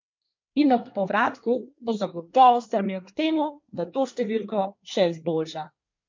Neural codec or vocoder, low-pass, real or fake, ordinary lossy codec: codec, 24 kHz, 1 kbps, SNAC; 7.2 kHz; fake; MP3, 48 kbps